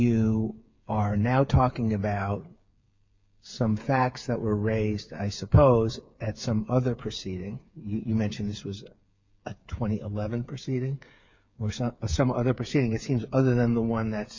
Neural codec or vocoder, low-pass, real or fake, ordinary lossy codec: codec, 16 kHz, 8 kbps, FreqCodec, smaller model; 7.2 kHz; fake; MP3, 48 kbps